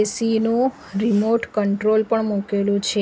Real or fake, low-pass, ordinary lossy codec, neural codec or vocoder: real; none; none; none